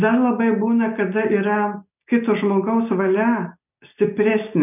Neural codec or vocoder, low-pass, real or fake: none; 3.6 kHz; real